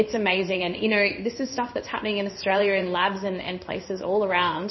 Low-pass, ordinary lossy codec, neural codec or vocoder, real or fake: 7.2 kHz; MP3, 24 kbps; codec, 16 kHz in and 24 kHz out, 1 kbps, XY-Tokenizer; fake